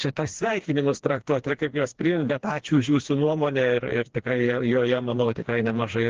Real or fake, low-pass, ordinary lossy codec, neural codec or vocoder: fake; 7.2 kHz; Opus, 16 kbps; codec, 16 kHz, 2 kbps, FreqCodec, smaller model